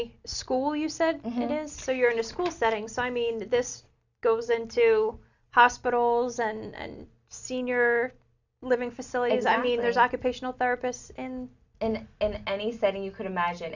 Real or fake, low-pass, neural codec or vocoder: real; 7.2 kHz; none